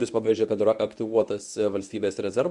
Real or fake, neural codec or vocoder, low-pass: fake; codec, 24 kHz, 0.9 kbps, WavTokenizer, medium speech release version 1; 10.8 kHz